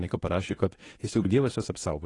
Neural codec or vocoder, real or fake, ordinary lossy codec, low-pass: codec, 24 kHz, 0.9 kbps, WavTokenizer, medium speech release version 1; fake; AAC, 32 kbps; 10.8 kHz